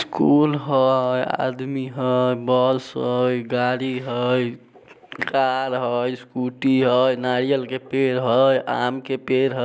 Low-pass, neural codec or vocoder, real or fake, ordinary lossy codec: none; none; real; none